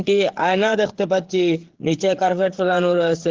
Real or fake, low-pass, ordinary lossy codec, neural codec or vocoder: fake; 7.2 kHz; Opus, 16 kbps; codec, 16 kHz, 8 kbps, FreqCodec, smaller model